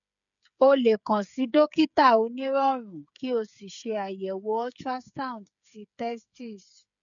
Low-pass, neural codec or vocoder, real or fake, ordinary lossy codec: 7.2 kHz; codec, 16 kHz, 8 kbps, FreqCodec, smaller model; fake; none